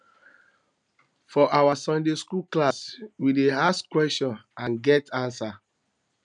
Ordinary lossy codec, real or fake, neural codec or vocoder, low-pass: none; real; none; 9.9 kHz